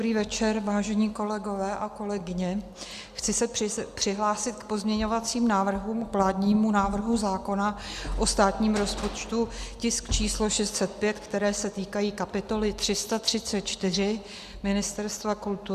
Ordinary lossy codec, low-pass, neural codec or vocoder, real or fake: Opus, 64 kbps; 14.4 kHz; none; real